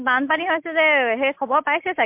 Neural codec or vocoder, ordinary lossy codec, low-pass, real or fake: none; MP3, 32 kbps; 3.6 kHz; real